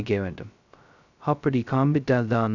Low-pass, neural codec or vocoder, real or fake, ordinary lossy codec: 7.2 kHz; codec, 16 kHz, 0.2 kbps, FocalCodec; fake; none